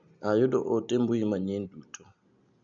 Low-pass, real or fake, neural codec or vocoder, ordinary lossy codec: 7.2 kHz; real; none; none